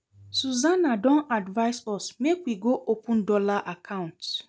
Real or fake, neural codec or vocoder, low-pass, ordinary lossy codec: real; none; none; none